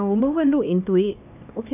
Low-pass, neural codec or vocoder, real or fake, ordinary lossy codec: 3.6 kHz; codec, 16 kHz, 2 kbps, X-Codec, HuBERT features, trained on LibriSpeech; fake; none